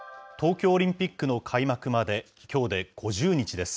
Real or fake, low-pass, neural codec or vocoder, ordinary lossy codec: real; none; none; none